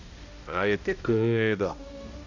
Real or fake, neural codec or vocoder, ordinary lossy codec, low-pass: fake; codec, 16 kHz, 0.5 kbps, X-Codec, HuBERT features, trained on balanced general audio; none; 7.2 kHz